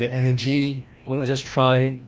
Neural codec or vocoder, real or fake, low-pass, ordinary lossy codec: codec, 16 kHz, 1 kbps, FreqCodec, larger model; fake; none; none